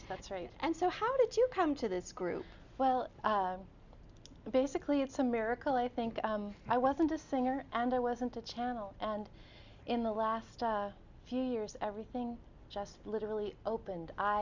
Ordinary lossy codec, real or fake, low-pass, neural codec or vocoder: Opus, 64 kbps; real; 7.2 kHz; none